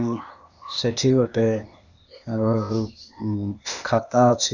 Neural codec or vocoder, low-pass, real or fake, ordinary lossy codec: codec, 16 kHz, 0.8 kbps, ZipCodec; 7.2 kHz; fake; none